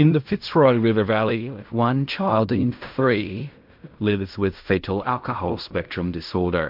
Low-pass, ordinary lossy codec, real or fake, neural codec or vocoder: 5.4 kHz; MP3, 48 kbps; fake; codec, 16 kHz in and 24 kHz out, 0.4 kbps, LongCat-Audio-Codec, fine tuned four codebook decoder